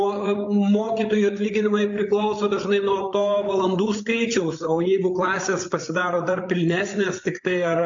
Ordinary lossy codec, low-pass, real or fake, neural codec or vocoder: AAC, 64 kbps; 7.2 kHz; fake; codec, 16 kHz, 8 kbps, FreqCodec, larger model